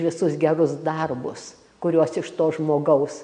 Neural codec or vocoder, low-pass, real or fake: none; 9.9 kHz; real